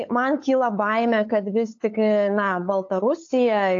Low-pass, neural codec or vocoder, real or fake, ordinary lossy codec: 7.2 kHz; codec, 16 kHz, 8 kbps, FunCodec, trained on LibriTTS, 25 frames a second; fake; AAC, 48 kbps